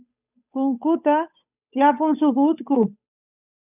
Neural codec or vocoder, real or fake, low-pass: codec, 16 kHz, 8 kbps, FunCodec, trained on Chinese and English, 25 frames a second; fake; 3.6 kHz